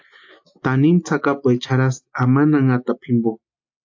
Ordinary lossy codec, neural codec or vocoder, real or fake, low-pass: AAC, 48 kbps; none; real; 7.2 kHz